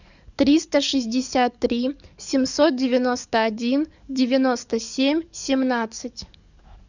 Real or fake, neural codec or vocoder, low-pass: fake; codec, 44.1 kHz, 7.8 kbps, DAC; 7.2 kHz